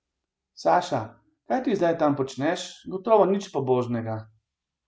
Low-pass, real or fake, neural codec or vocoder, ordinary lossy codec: none; real; none; none